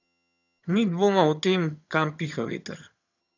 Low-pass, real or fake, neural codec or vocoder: 7.2 kHz; fake; vocoder, 22.05 kHz, 80 mel bands, HiFi-GAN